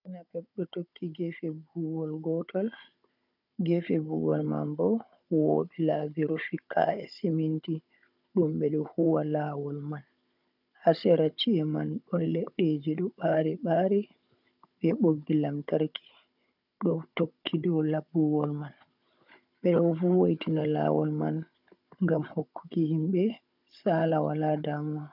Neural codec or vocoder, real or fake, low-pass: codec, 16 kHz, 16 kbps, FunCodec, trained on Chinese and English, 50 frames a second; fake; 5.4 kHz